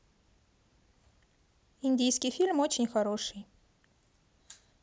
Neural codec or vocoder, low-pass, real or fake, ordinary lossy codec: none; none; real; none